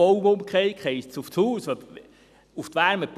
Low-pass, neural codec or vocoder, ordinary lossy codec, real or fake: 14.4 kHz; none; none; real